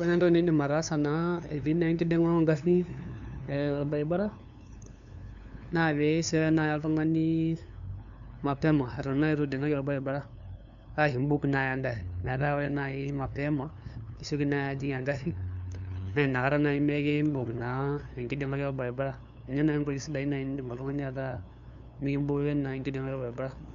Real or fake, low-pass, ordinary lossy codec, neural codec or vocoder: fake; 7.2 kHz; none; codec, 16 kHz, 2 kbps, FunCodec, trained on LibriTTS, 25 frames a second